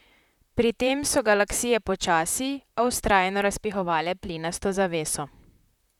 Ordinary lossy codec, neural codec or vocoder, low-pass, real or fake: none; vocoder, 44.1 kHz, 128 mel bands, Pupu-Vocoder; 19.8 kHz; fake